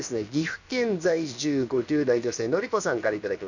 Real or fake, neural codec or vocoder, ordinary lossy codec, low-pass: fake; codec, 16 kHz, about 1 kbps, DyCAST, with the encoder's durations; none; 7.2 kHz